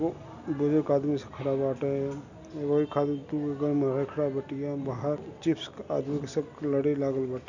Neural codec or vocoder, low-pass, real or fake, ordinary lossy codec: none; 7.2 kHz; real; none